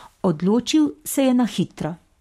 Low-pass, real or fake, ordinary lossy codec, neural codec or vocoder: 19.8 kHz; fake; MP3, 64 kbps; codec, 44.1 kHz, 7.8 kbps, Pupu-Codec